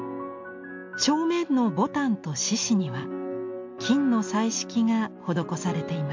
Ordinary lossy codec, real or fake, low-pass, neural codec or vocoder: MP3, 48 kbps; real; 7.2 kHz; none